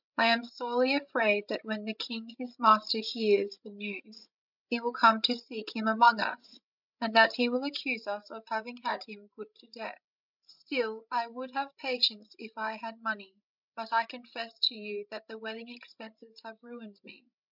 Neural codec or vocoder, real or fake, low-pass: codec, 16 kHz, 16 kbps, FreqCodec, larger model; fake; 5.4 kHz